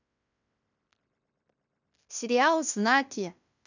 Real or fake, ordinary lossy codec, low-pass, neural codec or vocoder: fake; none; 7.2 kHz; codec, 16 kHz in and 24 kHz out, 0.9 kbps, LongCat-Audio-Codec, fine tuned four codebook decoder